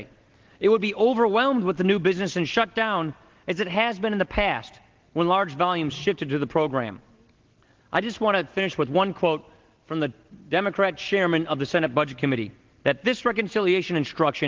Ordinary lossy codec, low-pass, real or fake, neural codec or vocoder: Opus, 16 kbps; 7.2 kHz; real; none